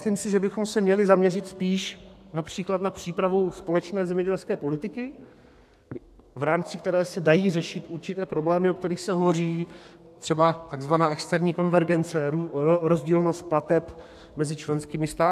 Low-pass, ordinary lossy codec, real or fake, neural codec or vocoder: 14.4 kHz; AAC, 96 kbps; fake; codec, 32 kHz, 1.9 kbps, SNAC